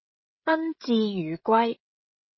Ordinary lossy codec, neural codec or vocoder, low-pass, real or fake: MP3, 24 kbps; codec, 16 kHz, 16 kbps, FreqCodec, smaller model; 7.2 kHz; fake